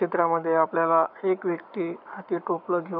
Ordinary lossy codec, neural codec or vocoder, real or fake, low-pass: none; codec, 44.1 kHz, 7.8 kbps, Pupu-Codec; fake; 5.4 kHz